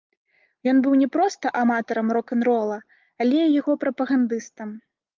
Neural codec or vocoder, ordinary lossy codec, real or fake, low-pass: none; Opus, 32 kbps; real; 7.2 kHz